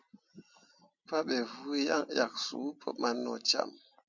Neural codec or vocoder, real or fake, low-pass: none; real; 7.2 kHz